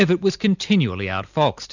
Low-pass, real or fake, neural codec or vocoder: 7.2 kHz; real; none